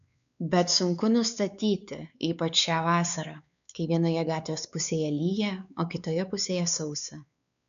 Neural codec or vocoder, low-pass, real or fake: codec, 16 kHz, 4 kbps, X-Codec, WavLM features, trained on Multilingual LibriSpeech; 7.2 kHz; fake